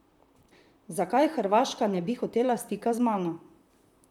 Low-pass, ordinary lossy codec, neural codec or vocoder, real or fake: 19.8 kHz; none; vocoder, 44.1 kHz, 128 mel bands, Pupu-Vocoder; fake